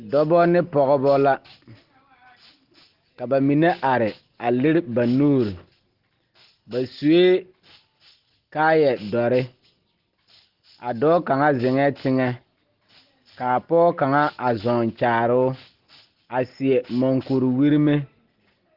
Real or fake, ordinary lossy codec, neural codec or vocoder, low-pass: real; Opus, 16 kbps; none; 5.4 kHz